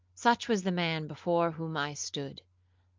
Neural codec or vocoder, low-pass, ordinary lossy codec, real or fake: none; 7.2 kHz; Opus, 32 kbps; real